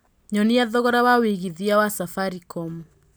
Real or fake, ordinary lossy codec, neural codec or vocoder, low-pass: real; none; none; none